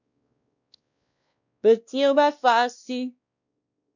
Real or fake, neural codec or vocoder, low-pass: fake; codec, 16 kHz, 1 kbps, X-Codec, WavLM features, trained on Multilingual LibriSpeech; 7.2 kHz